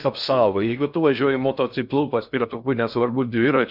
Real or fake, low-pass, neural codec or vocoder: fake; 5.4 kHz; codec, 16 kHz in and 24 kHz out, 0.6 kbps, FocalCodec, streaming, 2048 codes